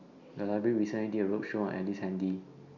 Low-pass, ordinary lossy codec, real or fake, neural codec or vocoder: 7.2 kHz; none; real; none